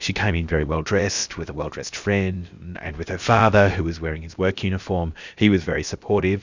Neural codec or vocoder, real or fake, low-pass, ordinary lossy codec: codec, 16 kHz, about 1 kbps, DyCAST, with the encoder's durations; fake; 7.2 kHz; Opus, 64 kbps